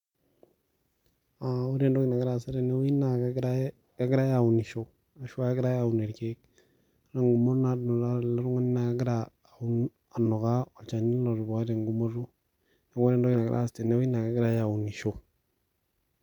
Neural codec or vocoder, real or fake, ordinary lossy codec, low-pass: none; real; MP3, 96 kbps; 19.8 kHz